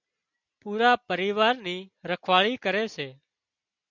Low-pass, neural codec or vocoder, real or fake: 7.2 kHz; none; real